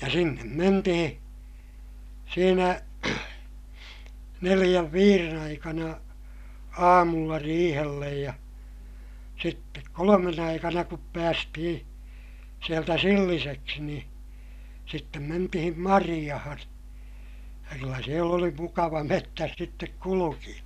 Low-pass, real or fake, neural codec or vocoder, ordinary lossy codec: 14.4 kHz; real; none; none